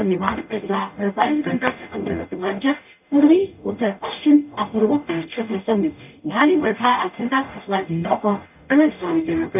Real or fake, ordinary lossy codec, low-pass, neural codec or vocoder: fake; none; 3.6 kHz; codec, 44.1 kHz, 0.9 kbps, DAC